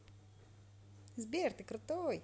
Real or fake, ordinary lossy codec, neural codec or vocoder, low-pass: real; none; none; none